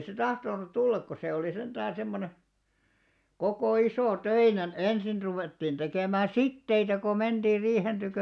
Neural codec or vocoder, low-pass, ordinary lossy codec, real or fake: none; none; none; real